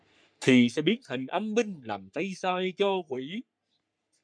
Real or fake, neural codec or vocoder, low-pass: fake; codec, 44.1 kHz, 3.4 kbps, Pupu-Codec; 9.9 kHz